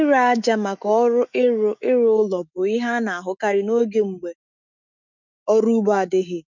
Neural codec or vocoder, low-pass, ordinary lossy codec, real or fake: autoencoder, 48 kHz, 128 numbers a frame, DAC-VAE, trained on Japanese speech; 7.2 kHz; none; fake